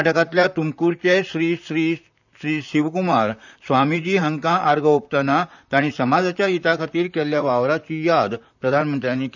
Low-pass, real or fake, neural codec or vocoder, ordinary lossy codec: 7.2 kHz; fake; vocoder, 44.1 kHz, 128 mel bands, Pupu-Vocoder; none